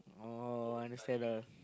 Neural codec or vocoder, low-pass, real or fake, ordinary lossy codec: none; none; real; none